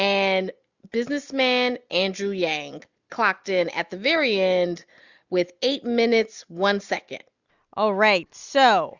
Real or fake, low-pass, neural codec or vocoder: real; 7.2 kHz; none